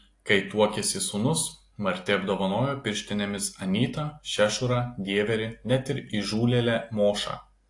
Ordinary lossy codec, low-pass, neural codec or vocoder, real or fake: AAC, 48 kbps; 10.8 kHz; none; real